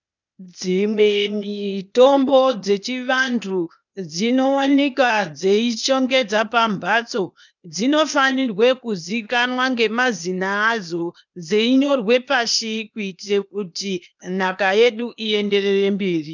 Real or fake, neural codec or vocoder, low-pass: fake; codec, 16 kHz, 0.8 kbps, ZipCodec; 7.2 kHz